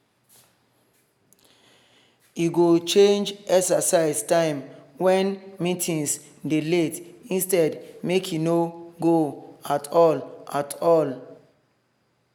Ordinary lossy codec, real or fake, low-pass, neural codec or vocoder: none; real; none; none